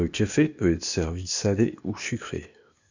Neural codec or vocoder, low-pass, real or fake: codec, 16 kHz, 0.8 kbps, ZipCodec; 7.2 kHz; fake